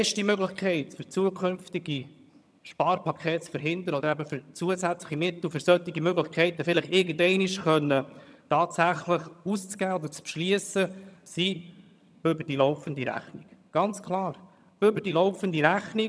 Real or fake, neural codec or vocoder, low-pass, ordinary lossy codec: fake; vocoder, 22.05 kHz, 80 mel bands, HiFi-GAN; none; none